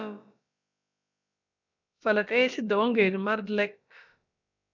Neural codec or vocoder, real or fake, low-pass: codec, 16 kHz, about 1 kbps, DyCAST, with the encoder's durations; fake; 7.2 kHz